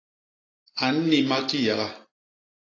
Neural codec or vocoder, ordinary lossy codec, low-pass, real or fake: none; AAC, 32 kbps; 7.2 kHz; real